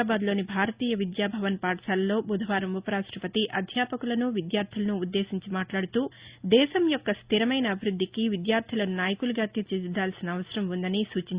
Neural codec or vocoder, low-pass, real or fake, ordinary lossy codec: none; 3.6 kHz; real; Opus, 64 kbps